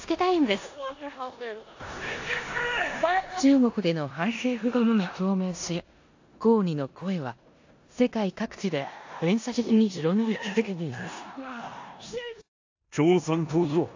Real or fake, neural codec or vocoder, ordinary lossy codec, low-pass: fake; codec, 16 kHz in and 24 kHz out, 0.9 kbps, LongCat-Audio-Codec, four codebook decoder; AAC, 48 kbps; 7.2 kHz